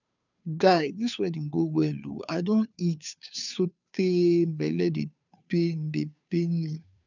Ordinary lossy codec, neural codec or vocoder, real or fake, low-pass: none; codec, 16 kHz, 2 kbps, FunCodec, trained on Chinese and English, 25 frames a second; fake; 7.2 kHz